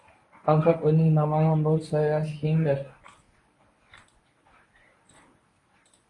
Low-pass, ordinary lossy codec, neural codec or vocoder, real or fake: 10.8 kHz; AAC, 32 kbps; codec, 24 kHz, 0.9 kbps, WavTokenizer, medium speech release version 1; fake